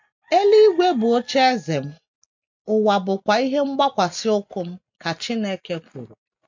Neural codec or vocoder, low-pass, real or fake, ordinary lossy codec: none; 7.2 kHz; real; MP3, 48 kbps